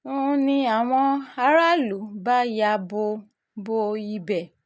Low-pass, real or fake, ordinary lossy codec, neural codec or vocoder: none; real; none; none